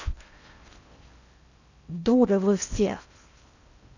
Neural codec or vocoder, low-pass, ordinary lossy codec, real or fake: codec, 16 kHz in and 24 kHz out, 0.6 kbps, FocalCodec, streaming, 4096 codes; 7.2 kHz; none; fake